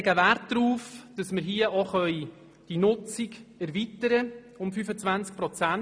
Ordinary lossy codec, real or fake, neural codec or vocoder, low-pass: none; real; none; none